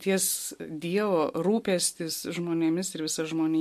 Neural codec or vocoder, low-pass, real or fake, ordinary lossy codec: none; 14.4 kHz; real; MP3, 64 kbps